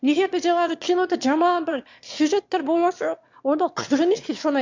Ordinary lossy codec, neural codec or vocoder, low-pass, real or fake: MP3, 64 kbps; autoencoder, 22.05 kHz, a latent of 192 numbers a frame, VITS, trained on one speaker; 7.2 kHz; fake